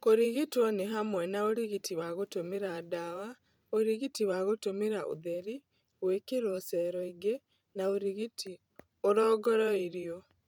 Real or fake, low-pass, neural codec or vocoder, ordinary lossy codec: fake; 19.8 kHz; vocoder, 44.1 kHz, 128 mel bands every 512 samples, BigVGAN v2; MP3, 96 kbps